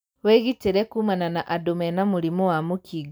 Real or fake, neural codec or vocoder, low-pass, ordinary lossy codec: real; none; none; none